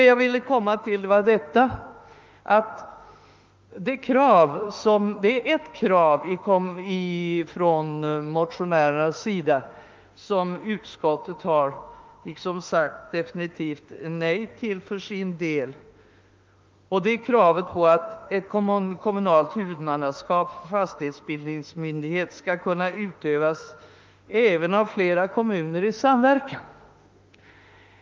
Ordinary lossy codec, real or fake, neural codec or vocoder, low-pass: Opus, 24 kbps; fake; autoencoder, 48 kHz, 32 numbers a frame, DAC-VAE, trained on Japanese speech; 7.2 kHz